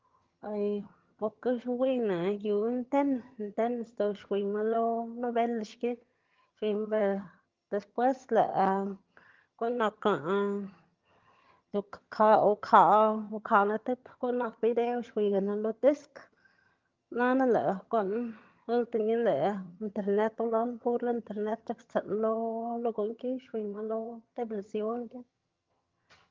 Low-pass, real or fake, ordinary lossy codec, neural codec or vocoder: 7.2 kHz; fake; Opus, 32 kbps; vocoder, 22.05 kHz, 80 mel bands, HiFi-GAN